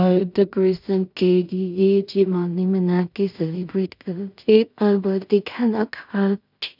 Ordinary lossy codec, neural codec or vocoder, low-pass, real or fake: none; codec, 16 kHz in and 24 kHz out, 0.4 kbps, LongCat-Audio-Codec, two codebook decoder; 5.4 kHz; fake